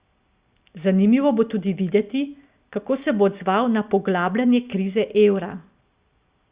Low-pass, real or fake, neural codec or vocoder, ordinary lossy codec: 3.6 kHz; real; none; Opus, 64 kbps